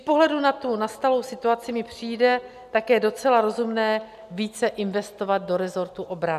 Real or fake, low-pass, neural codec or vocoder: real; 14.4 kHz; none